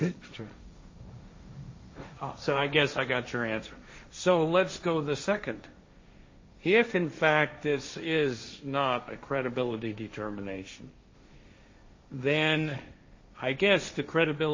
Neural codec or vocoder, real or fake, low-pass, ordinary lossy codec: codec, 16 kHz, 1.1 kbps, Voila-Tokenizer; fake; 7.2 kHz; MP3, 32 kbps